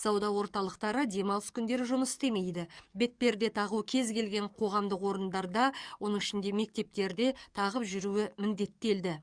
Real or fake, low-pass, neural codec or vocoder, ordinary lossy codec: fake; 9.9 kHz; codec, 44.1 kHz, 7.8 kbps, DAC; Opus, 64 kbps